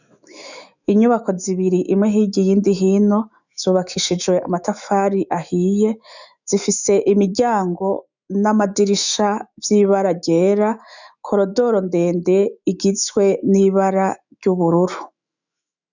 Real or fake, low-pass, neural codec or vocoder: fake; 7.2 kHz; autoencoder, 48 kHz, 128 numbers a frame, DAC-VAE, trained on Japanese speech